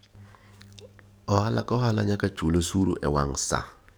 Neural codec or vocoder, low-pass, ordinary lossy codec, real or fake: codec, 44.1 kHz, 7.8 kbps, DAC; none; none; fake